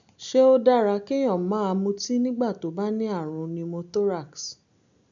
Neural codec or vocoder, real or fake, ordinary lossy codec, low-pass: none; real; none; 7.2 kHz